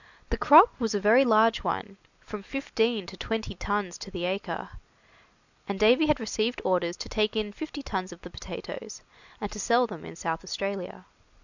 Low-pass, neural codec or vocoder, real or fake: 7.2 kHz; none; real